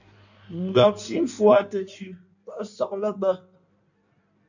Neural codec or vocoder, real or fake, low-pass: codec, 16 kHz in and 24 kHz out, 1.1 kbps, FireRedTTS-2 codec; fake; 7.2 kHz